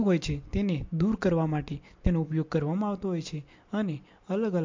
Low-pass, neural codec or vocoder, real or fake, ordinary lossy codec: 7.2 kHz; none; real; MP3, 48 kbps